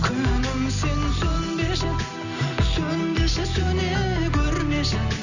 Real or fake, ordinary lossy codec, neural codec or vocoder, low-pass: real; none; none; 7.2 kHz